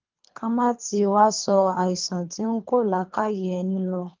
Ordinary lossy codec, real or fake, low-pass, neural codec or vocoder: Opus, 24 kbps; fake; 7.2 kHz; codec, 24 kHz, 3 kbps, HILCodec